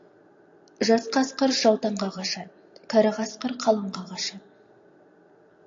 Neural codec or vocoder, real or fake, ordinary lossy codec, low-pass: none; real; AAC, 48 kbps; 7.2 kHz